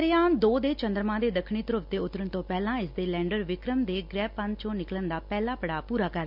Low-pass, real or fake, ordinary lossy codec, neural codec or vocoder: 5.4 kHz; real; none; none